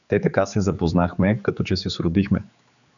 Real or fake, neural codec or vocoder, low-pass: fake; codec, 16 kHz, 4 kbps, X-Codec, HuBERT features, trained on general audio; 7.2 kHz